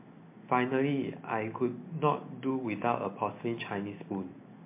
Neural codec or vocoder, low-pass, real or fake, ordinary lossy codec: none; 3.6 kHz; real; MP3, 24 kbps